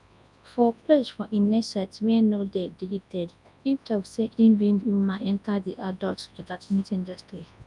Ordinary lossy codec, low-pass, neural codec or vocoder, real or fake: none; 10.8 kHz; codec, 24 kHz, 0.9 kbps, WavTokenizer, large speech release; fake